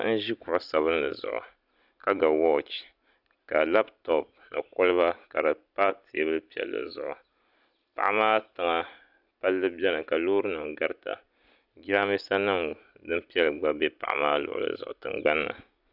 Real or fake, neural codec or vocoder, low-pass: real; none; 5.4 kHz